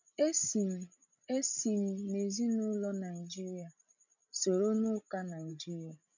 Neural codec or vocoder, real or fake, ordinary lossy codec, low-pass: codec, 16 kHz, 16 kbps, FreqCodec, larger model; fake; none; 7.2 kHz